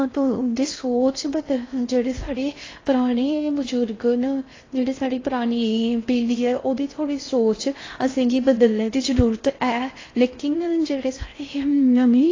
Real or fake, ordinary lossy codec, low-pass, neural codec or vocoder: fake; AAC, 32 kbps; 7.2 kHz; codec, 16 kHz in and 24 kHz out, 0.6 kbps, FocalCodec, streaming, 4096 codes